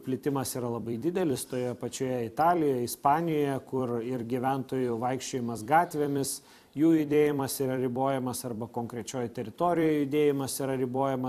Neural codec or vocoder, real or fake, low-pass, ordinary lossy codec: vocoder, 44.1 kHz, 128 mel bands every 256 samples, BigVGAN v2; fake; 14.4 kHz; AAC, 96 kbps